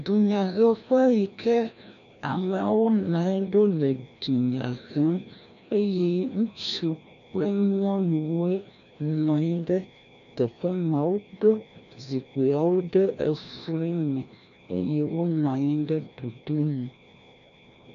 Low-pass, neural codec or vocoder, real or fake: 7.2 kHz; codec, 16 kHz, 1 kbps, FreqCodec, larger model; fake